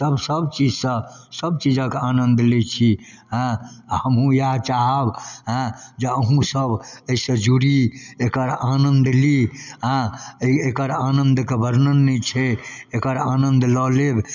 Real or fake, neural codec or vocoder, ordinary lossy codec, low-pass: real; none; none; 7.2 kHz